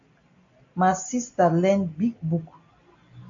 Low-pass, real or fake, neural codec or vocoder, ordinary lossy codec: 7.2 kHz; real; none; AAC, 48 kbps